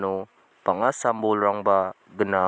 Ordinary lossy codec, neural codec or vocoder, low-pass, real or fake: none; none; none; real